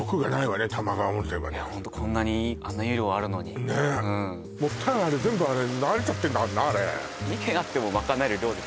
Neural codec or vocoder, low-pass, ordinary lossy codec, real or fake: none; none; none; real